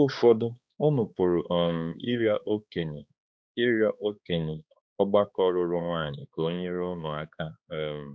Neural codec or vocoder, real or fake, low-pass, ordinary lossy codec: codec, 16 kHz, 2 kbps, X-Codec, HuBERT features, trained on balanced general audio; fake; none; none